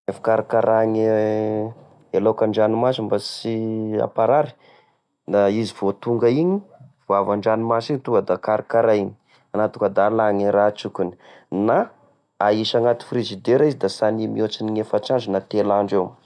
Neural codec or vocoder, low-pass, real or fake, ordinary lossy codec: none; none; real; none